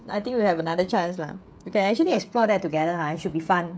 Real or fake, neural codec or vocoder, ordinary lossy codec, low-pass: fake; codec, 16 kHz, 16 kbps, FreqCodec, smaller model; none; none